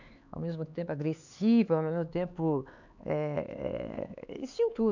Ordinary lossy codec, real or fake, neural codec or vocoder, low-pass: none; fake; codec, 16 kHz, 4 kbps, X-Codec, HuBERT features, trained on LibriSpeech; 7.2 kHz